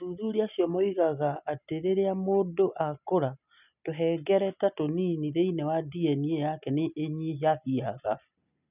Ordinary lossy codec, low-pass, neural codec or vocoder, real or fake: none; 3.6 kHz; none; real